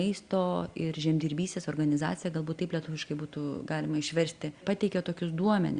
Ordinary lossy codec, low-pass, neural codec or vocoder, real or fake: Opus, 64 kbps; 9.9 kHz; none; real